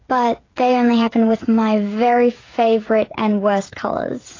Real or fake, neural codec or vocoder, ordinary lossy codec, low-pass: fake; codec, 16 kHz, 8 kbps, FreqCodec, smaller model; AAC, 32 kbps; 7.2 kHz